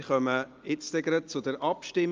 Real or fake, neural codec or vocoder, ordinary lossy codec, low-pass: real; none; Opus, 24 kbps; 7.2 kHz